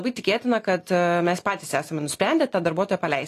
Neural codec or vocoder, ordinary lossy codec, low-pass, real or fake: none; AAC, 48 kbps; 14.4 kHz; real